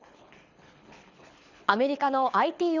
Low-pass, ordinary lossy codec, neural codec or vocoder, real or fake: 7.2 kHz; Opus, 64 kbps; codec, 24 kHz, 6 kbps, HILCodec; fake